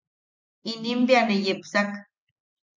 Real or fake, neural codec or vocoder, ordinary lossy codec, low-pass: real; none; MP3, 64 kbps; 7.2 kHz